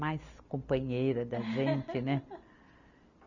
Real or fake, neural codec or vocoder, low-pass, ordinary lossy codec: real; none; 7.2 kHz; none